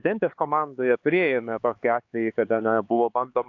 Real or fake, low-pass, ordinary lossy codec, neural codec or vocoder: fake; 7.2 kHz; AAC, 48 kbps; codec, 16 kHz, 4 kbps, X-Codec, HuBERT features, trained on LibriSpeech